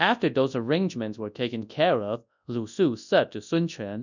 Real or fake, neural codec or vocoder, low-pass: fake; codec, 24 kHz, 0.9 kbps, WavTokenizer, large speech release; 7.2 kHz